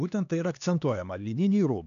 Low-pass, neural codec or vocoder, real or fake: 7.2 kHz; codec, 16 kHz, 2 kbps, X-Codec, HuBERT features, trained on LibriSpeech; fake